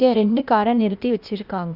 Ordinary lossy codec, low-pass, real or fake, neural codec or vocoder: Opus, 64 kbps; 5.4 kHz; fake; codec, 16 kHz, 0.8 kbps, ZipCodec